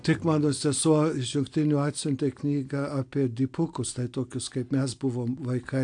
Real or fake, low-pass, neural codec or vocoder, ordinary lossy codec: real; 9.9 kHz; none; AAC, 64 kbps